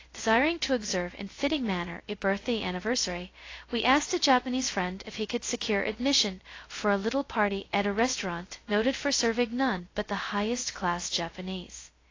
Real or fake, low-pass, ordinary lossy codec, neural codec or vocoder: fake; 7.2 kHz; AAC, 32 kbps; codec, 16 kHz, 0.2 kbps, FocalCodec